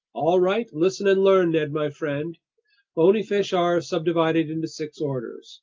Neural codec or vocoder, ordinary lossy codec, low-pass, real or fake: none; Opus, 24 kbps; 7.2 kHz; real